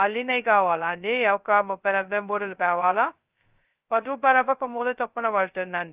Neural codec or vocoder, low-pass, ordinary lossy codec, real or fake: codec, 16 kHz, 0.2 kbps, FocalCodec; 3.6 kHz; Opus, 24 kbps; fake